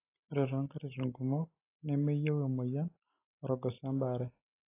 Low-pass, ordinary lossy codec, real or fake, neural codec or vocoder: 3.6 kHz; AAC, 24 kbps; real; none